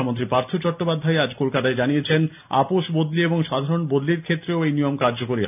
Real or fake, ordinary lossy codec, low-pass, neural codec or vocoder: real; none; 3.6 kHz; none